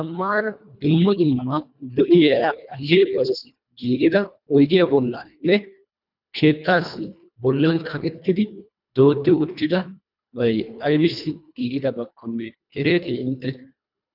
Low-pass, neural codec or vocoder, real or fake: 5.4 kHz; codec, 24 kHz, 1.5 kbps, HILCodec; fake